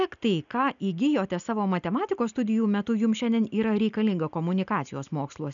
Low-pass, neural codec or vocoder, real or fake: 7.2 kHz; none; real